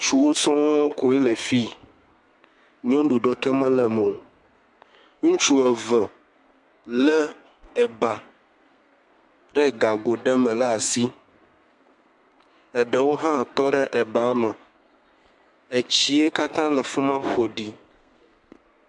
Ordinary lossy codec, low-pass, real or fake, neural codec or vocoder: MP3, 64 kbps; 10.8 kHz; fake; codec, 32 kHz, 1.9 kbps, SNAC